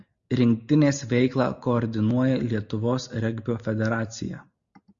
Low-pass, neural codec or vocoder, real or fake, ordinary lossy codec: 7.2 kHz; none; real; Opus, 64 kbps